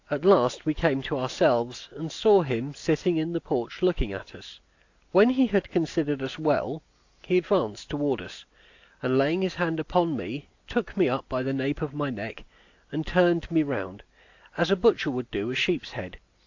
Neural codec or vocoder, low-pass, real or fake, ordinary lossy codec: none; 7.2 kHz; real; AAC, 48 kbps